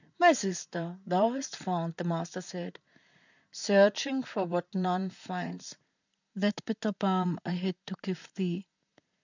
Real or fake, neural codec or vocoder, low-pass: fake; vocoder, 44.1 kHz, 128 mel bands, Pupu-Vocoder; 7.2 kHz